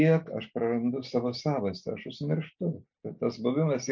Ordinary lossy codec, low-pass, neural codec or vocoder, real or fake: MP3, 64 kbps; 7.2 kHz; none; real